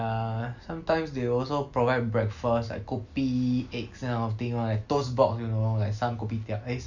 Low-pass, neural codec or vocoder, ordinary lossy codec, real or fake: 7.2 kHz; none; none; real